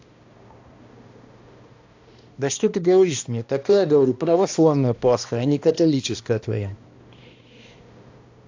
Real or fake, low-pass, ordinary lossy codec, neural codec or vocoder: fake; 7.2 kHz; AAC, 48 kbps; codec, 16 kHz, 1 kbps, X-Codec, HuBERT features, trained on balanced general audio